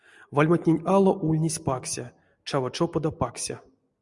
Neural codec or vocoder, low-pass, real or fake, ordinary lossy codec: none; 10.8 kHz; real; Opus, 64 kbps